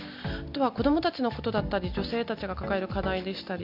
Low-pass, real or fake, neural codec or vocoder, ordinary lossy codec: 5.4 kHz; real; none; none